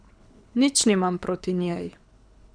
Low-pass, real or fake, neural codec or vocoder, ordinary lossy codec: 9.9 kHz; fake; codec, 24 kHz, 6 kbps, HILCodec; none